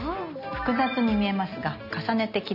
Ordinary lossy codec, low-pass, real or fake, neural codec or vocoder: none; 5.4 kHz; real; none